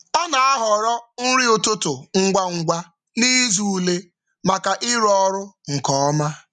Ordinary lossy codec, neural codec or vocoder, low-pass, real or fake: none; none; 10.8 kHz; real